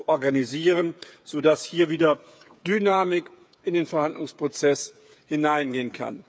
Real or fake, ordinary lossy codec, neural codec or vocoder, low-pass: fake; none; codec, 16 kHz, 8 kbps, FreqCodec, smaller model; none